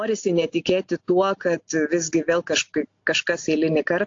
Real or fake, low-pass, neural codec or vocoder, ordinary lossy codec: real; 7.2 kHz; none; AAC, 48 kbps